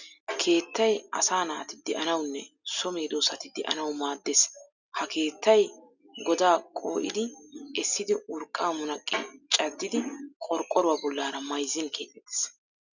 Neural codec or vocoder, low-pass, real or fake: none; 7.2 kHz; real